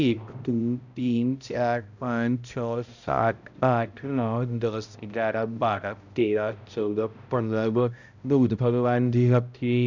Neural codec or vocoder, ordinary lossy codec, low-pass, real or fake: codec, 16 kHz, 0.5 kbps, X-Codec, HuBERT features, trained on balanced general audio; none; 7.2 kHz; fake